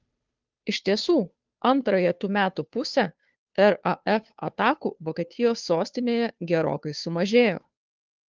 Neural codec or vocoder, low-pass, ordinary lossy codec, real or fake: codec, 16 kHz, 2 kbps, FunCodec, trained on Chinese and English, 25 frames a second; 7.2 kHz; Opus, 32 kbps; fake